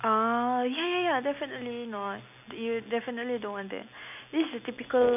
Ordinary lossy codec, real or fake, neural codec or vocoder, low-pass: none; real; none; 3.6 kHz